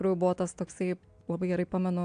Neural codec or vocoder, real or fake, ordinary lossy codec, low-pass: none; real; AAC, 64 kbps; 9.9 kHz